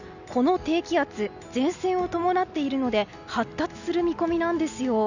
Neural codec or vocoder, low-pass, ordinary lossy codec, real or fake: none; 7.2 kHz; none; real